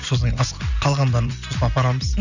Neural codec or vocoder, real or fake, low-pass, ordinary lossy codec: none; real; 7.2 kHz; none